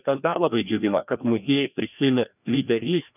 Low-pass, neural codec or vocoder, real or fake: 3.6 kHz; codec, 16 kHz, 1 kbps, FreqCodec, larger model; fake